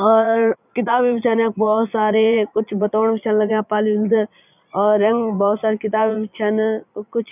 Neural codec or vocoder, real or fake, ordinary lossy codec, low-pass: vocoder, 22.05 kHz, 80 mel bands, Vocos; fake; none; 3.6 kHz